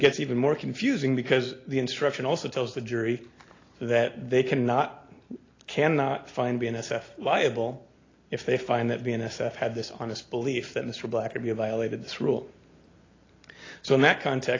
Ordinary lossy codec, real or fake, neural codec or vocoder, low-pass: AAC, 32 kbps; real; none; 7.2 kHz